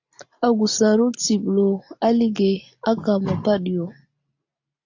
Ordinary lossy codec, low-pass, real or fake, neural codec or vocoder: AAC, 48 kbps; 7.2 kHz; real; none